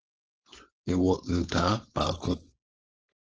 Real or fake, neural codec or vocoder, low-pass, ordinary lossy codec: fake; codec, 16 kHz, 4.8 kbps, FACodec; 7.2 kHz; Opus, 16 kbps